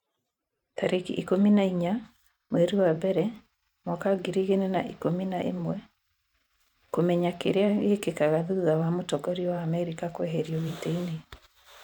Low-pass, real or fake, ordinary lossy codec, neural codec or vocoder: 19.8 kHz; fake; none; vocoder, 44.1 kHz, 128 mel bands every 512 samples, BigVGAN v2